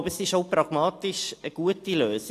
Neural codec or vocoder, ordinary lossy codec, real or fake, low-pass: none; AAC, 64 kbps; real; 14.4 kHz